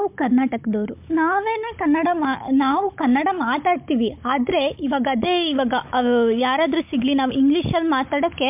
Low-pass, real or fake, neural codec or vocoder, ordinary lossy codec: 3.6 kHz; fake; codec, 16 kHz, 16 kbps, FunCodec, trained on Chinese and English, 50 frames a second; MP3, 32 kbps